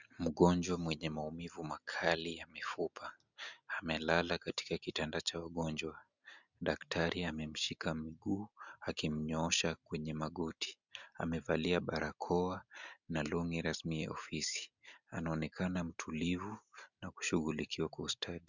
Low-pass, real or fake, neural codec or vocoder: 7.2 kHz; real; none